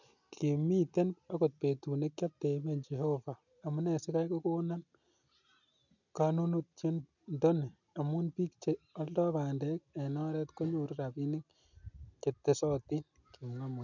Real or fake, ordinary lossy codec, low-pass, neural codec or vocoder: real; none; 7.2 kHz; none